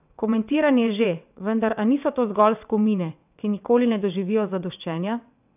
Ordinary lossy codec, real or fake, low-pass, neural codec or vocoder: none; fake; 3.6 kHz; vocoder, 22.05 kHz, 80 mel bands, WaveNeXt